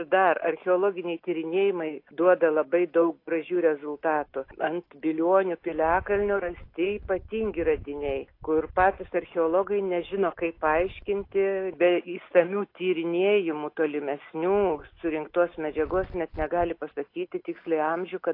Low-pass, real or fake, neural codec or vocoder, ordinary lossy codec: 5.4 kHz; real; none; AAC, 32 kbps